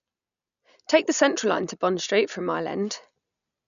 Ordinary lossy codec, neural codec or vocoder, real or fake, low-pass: none; none; real; 7.2 kHz